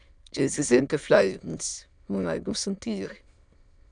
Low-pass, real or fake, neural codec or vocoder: 9.9 kHz; fake; autoencoder, 22.05 kHz, a latent of 192 numbers a frame, VITS, trained on many speakers